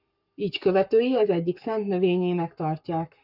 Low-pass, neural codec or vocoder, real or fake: 5.4 kHz; codec, 44.1 kHz, 7.8 kbps, Pupu-Codec; fake